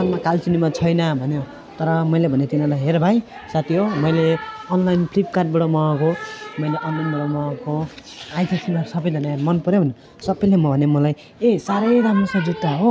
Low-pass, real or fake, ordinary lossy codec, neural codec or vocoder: none; real; none; none